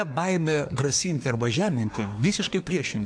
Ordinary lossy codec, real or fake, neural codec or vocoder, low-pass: AAC, 64 kbps; fake; codec, 24 kHz, 1 kbps, SNAC; 9.9 kHz